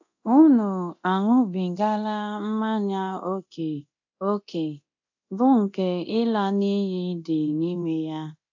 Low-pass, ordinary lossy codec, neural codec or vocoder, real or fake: 7.2 kHz; AAC, 48 kbps; codec, 24 kHz, 0.9 kbps, DualCodec; fake